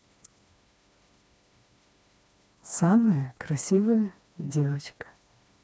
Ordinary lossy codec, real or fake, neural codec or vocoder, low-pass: none; fake; codec, 16 kHz, 2 kbps, FreqCodec, smaller model; none